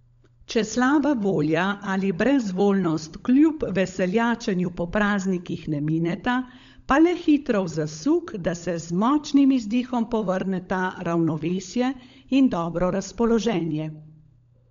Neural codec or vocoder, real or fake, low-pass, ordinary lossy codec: codec, 16 kHz, 16 kbps, FunCodec, trained on LibriTTS, 50 frames a second; fake; 7.2 kHz; MP3, 64 kbps